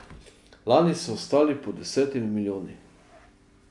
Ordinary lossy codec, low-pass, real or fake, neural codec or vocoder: none; 10.8 kHz; real; none